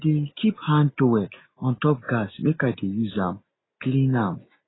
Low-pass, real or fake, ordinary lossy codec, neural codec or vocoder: 7.2 kHz; real; AAC, 16 kbps; none